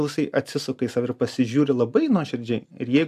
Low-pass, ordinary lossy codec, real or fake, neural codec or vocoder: 14.4 kHz; AAC, 96 kbps; real; none